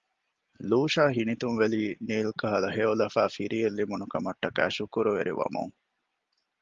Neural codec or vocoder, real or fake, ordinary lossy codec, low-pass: none; real; Opus, 32 kbps; 7.2 kHz